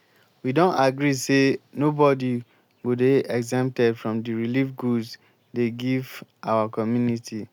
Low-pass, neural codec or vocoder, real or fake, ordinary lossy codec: 19.8 kHz; none; real; none